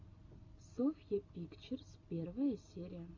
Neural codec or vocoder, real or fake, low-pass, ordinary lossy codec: none; real; 7.2 kHz; AAC, 48 kbps